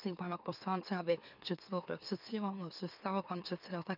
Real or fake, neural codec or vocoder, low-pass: fake; autoencoder, 44.1 kHz, a latent of 192 numbers a frame, MeloTTS; 5.4 kHz